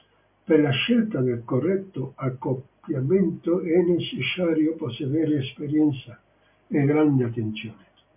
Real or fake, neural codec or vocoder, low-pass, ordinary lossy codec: real; none; 3.6 kHz; MP3, 32 kbps